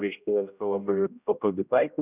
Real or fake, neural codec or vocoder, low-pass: fake; codec, 16 kHz, 0.5 kbps, X-Codec, HuBERT features, trained on general audio; 3.6 kHz